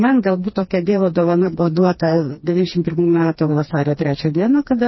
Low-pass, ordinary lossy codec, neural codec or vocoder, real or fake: 7.2 kHz; MP3, 24 kbps; codec, 16 kHz, 2 kbps, FreqCodec, smaller model; fake